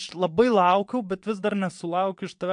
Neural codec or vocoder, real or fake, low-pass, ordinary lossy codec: vocoder, 22.05 kHz, 80 mel bands, WaveNeXt; fake; 9.9 kHz; MP3, 64 kbps